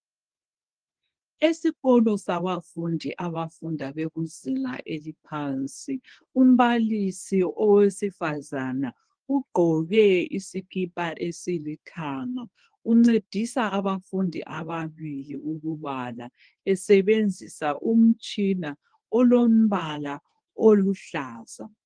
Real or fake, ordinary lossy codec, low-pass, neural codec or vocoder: fake; Opus, 24 kbps; 9.9 kHz; codec, 24 kHz, 0.9 kbps, WavTokenizer, medium speech release version 1